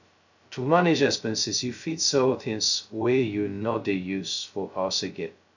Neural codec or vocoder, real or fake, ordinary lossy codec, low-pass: codec, 16 kHz, 0.2 kbps, FocalCodec; fake; none; 7.2 kHz